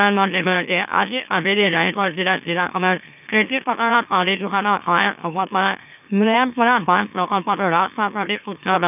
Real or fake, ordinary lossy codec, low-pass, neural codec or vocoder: fake; none; 3.6 kHz; autoencoder, 44.1 kHz, a latent of 192 numbers a frame, MeloTTS